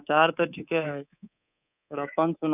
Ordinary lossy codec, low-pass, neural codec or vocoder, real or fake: none; 3.6 kHz; codec, 24 kHz, 3.1 kbps, DualCodec; fake